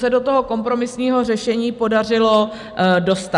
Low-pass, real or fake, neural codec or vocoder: 10.8 kHz; real; none